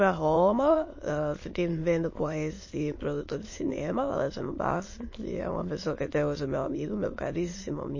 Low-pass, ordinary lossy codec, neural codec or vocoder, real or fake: 7.2 kHz; MP3, 32 kbps; autoencoder, 22.05 kHz, a latent of 192 numbers a frame, VITS, trained on many speakers; fake